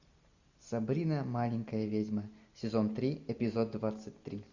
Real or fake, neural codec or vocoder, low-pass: real; none; 7.2 kHz